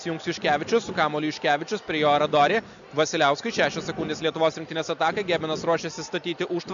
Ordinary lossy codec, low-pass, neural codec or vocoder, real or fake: MP3, 96 kbps; 7.2 kHz; none; real